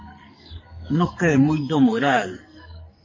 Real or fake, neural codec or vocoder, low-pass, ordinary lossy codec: fake; codec, 44.1 kHz, 2.6 kbps, SNAC; 7.2 kHz; MP3, 32 kbps